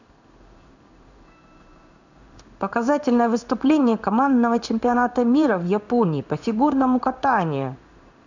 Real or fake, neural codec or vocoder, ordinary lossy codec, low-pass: fake; codec, 16 kHz in and 24 kHz out, 1 kbps, XY-Tokenizer; none; 7.2 kHz